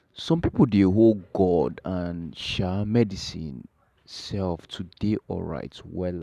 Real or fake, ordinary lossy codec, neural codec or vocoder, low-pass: fake; none; vocoder, 44.1 kHz, 128 mel bands every 512 samples, BigVGAN v2; 14.4 kHz